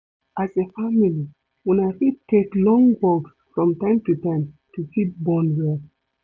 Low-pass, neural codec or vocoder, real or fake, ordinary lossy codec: none; none; real; none